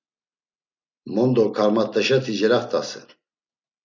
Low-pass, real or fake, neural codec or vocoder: 7.2 kHz; real; none